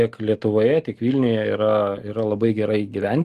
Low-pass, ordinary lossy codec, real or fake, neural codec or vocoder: 14.4 kHz; Opus, 24 kbps; real; none